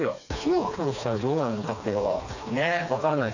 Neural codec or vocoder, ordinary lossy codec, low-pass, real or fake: codec, 16 kHz, 2 kbps, FreqCodec, smaller model; none; 7.2 kHz; fake